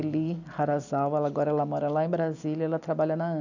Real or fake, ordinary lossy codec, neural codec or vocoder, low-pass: real; none; none; 7.2 kHz